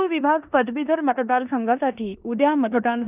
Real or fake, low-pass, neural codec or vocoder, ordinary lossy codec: fake; 3.6 kHz; codec, 16 kHz in and 24 kHz out, 0.9 kbps, LongCat-Audio-Codec, four codebook decoder; none